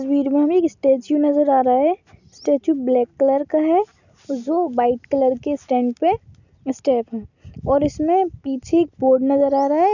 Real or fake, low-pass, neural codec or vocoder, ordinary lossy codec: real; 7.2 kHz; none; none